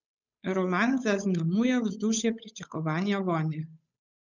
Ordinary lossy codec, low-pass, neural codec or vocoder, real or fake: none; 7.2 kHz; codec, 16 kHz, 8 kbps, FunCodec, trained on Chinese and English, 25 frames a second; fake